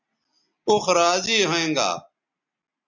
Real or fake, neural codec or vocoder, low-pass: real; none; 7.2 kHz